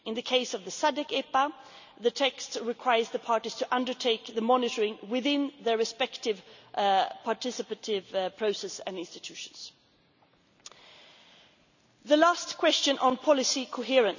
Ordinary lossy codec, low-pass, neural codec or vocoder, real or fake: none; 7.2 kHz; none; real